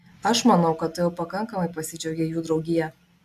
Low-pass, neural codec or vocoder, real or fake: 14.4 kHz; none; real